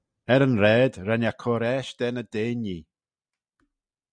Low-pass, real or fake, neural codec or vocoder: 9.9 kHz; real; none